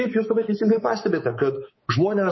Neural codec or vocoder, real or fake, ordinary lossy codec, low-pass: codec, 24 kHz, 3.1 kbps, DualCodec; fake; MP3, 24 kbps; 7.2 kHz